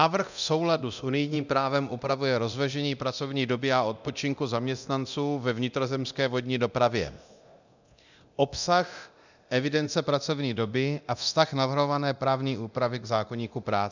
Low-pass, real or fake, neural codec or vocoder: 7.2 kHz; fake; codec, 24 kHz, 0.9 kbps, DualCodec